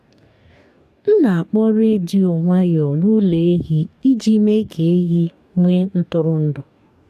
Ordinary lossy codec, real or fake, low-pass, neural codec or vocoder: none; fake; 14.4 kHz; codec, 44.1 kHz, 2.6 kbps, DAC